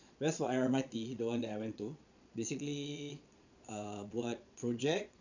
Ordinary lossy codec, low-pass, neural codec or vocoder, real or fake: none; 7.2 kHz; vocoder, 22.05 kHz, 80 mel bands, Vocos; fake